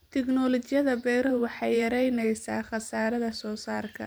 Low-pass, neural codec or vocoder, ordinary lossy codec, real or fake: none; vocoder, 44.1 kHz, 128 mel bands every 512 samples, BigVGAN v2; none; fake